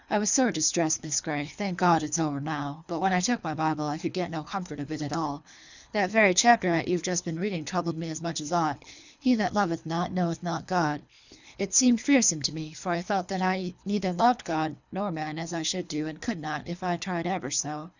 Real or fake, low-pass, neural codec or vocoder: fake; 7.2 kHz; codec, 24 kHz, 3 kbps, HILCodec